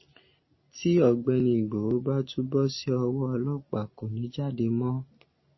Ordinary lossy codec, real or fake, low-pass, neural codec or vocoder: MP3, 24 kbps; real; 7.2 kHz; none